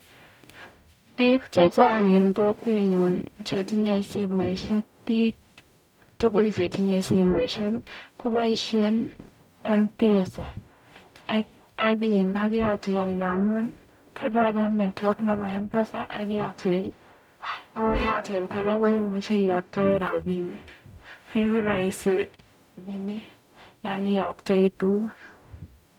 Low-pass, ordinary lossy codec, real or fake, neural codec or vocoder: 19.8 kHz; none; fake; codec, 44.1 kHz, 0.9 kbps, DAC